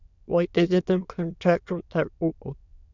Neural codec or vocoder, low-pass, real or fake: autoencoder, 22.05 kHz, a latent of 192 numbers a frame, VITS, trained on many speakers; 7.2 kHz; fake